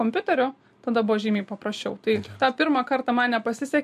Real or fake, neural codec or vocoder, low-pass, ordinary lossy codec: real; none; 14.4 kHz; MP3, 64 kbps